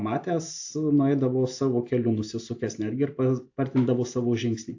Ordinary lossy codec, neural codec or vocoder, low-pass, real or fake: AAC, 48 kbps; none; 7.2 kHz; real